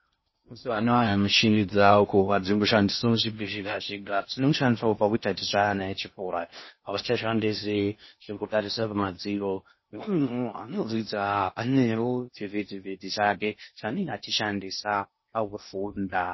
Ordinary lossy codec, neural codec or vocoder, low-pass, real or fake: MP3, 24 kbps; codec, 16 kHz in and 24 kHz out, 0.6 kbps, FocalCodec, streaming, 4096 codes; 7.2 kHz; fake